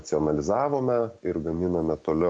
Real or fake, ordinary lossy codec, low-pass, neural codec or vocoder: real; MP3, 96 kbps; 9.9 kHz; none